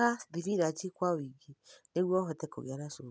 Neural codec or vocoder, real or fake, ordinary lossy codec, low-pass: none; real; none; none